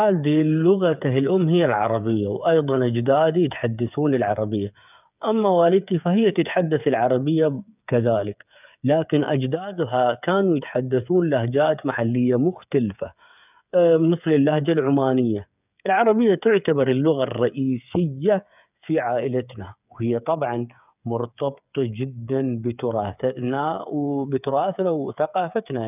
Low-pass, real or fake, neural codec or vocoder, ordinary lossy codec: 3.6 kHz; fake; codec, 16 kHz, 8 kbps, FreqCodec, smaller model; none